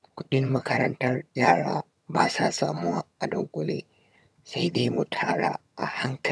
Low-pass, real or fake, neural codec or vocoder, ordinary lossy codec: none; fake; vocoder, 22.05 kHz, 80 mel bands, HiFi-GAN; none